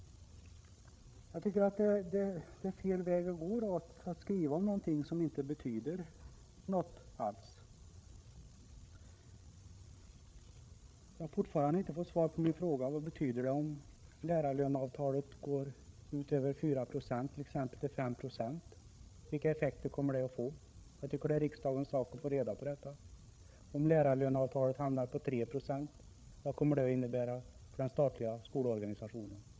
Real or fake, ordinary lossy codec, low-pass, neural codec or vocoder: fake; none; none; codec, 16 kHz, 8 kbps, FreqCodec, larger model